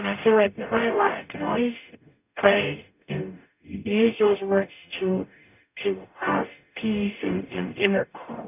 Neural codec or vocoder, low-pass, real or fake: codec, 44.1 kHz, 0.9 kbps, DAC; 3.6 kHz; fake